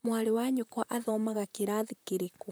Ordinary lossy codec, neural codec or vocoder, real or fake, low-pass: none; vocoder, 44.1 kHz, 128 mel bands, Pupu-Vocoder; fake; none